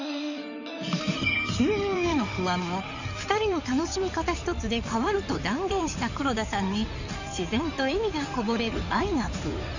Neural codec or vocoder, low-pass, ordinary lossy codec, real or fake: codec, 16 kHz in and 24 kHz out, 2.2 kbps, FireRedTTS-2 codec; 7.2 kHz; none; fake